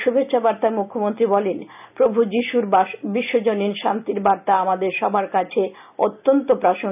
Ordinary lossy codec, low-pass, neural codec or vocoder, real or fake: none; 3.6 kHz; none; real